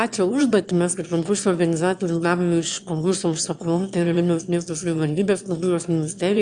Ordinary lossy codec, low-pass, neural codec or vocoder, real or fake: Opus, 64 kbps; 9.9 kHz; autoencoder, 22.05 kHz, a latent of 192 numbers a frame, VITS, trained on one speaker; fake